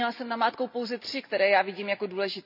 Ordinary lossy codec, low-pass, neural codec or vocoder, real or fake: none; 5.4 kHz; none; real